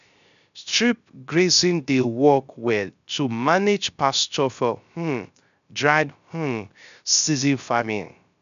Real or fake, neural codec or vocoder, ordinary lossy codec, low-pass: fake; codec, 16 kHz, 0.3 kbps, FocalCodec; none; 7.2 kHz